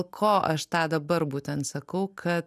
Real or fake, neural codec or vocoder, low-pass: real; none; 14.4 kHz